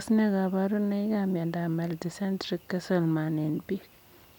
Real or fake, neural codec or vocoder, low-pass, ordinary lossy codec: real; none; 19.8 kHz; none